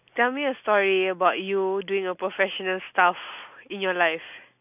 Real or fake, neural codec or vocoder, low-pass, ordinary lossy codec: real; none; 3.6 kHz; none